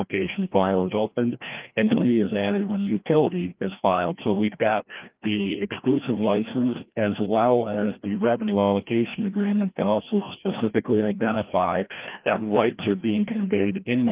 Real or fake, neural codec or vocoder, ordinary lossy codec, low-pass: fake; codec, 16 kHz, 1 kbps, FreqCodec, larger model; Opus, 32 kbps; 3.6 kHz